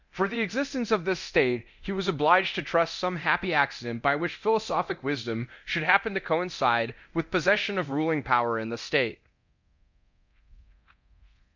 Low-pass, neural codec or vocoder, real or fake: 7.2 kHz; codec, 24 kHz, 0.9 kbps, DualCodec; fake